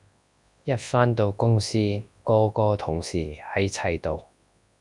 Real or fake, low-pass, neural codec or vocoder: fake; 10.8 kHz; codec, 24 kHz, 0.9 kbps, WavTokenizer, large speech release